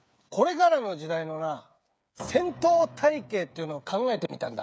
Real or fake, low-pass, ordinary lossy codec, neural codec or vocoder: fake; none; none; codec, 16 kHz, 8 kbps, FreqCodec, smaller model